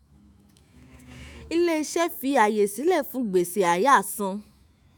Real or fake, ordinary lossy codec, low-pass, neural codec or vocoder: fake; none; none; autoencoder, 48 kHz, 128 numbers a frame, DAC-VAE, trained on Japanese speech